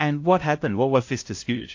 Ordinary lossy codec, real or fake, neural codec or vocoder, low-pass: AAC, 48 kbps; fake; codec, 16 kHz, 0.5 kbps, FunCodec, trained on LibriTTS, 25 frames a second; 7.2 kHz